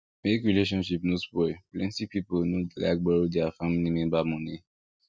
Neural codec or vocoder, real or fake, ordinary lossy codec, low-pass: none; real; none; none